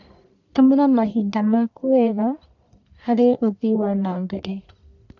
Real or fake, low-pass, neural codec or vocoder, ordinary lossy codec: fake; 7.2 kHz; codec, 44.1 kHz, 1.7 kbps, Pupu-Codec; none